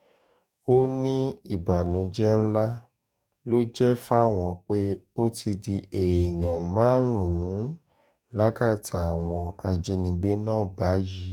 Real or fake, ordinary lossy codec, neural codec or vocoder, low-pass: fake; none; codec, 44.1 kHz, 2.6 kbps, DAC; 19.8 kHz